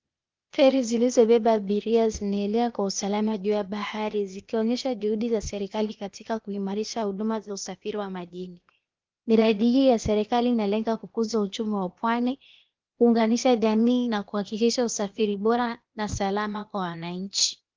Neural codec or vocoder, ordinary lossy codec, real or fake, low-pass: codec, 16 kHz, 0.8 kbps, ZipCodec; Opus, 32 kbps; fake; 7.2 kHz